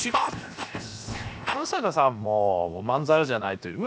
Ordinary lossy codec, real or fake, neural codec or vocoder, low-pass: none; fake; codec, 16 kHz, 0.7 kbps, FocalCodec; none